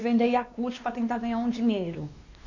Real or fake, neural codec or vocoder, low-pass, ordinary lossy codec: fake; codec, 16 kHz, 2 kbps, X-Codec, WavLM features, trained on Multilingual LibriSpeech; 7.2 kHz; none